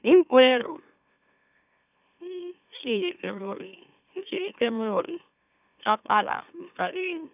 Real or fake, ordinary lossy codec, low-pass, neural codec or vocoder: fake; none; 3.6 kHz; autoencoder, 44.1 kHz, a latent of 192 numbers a frame, MeloTTS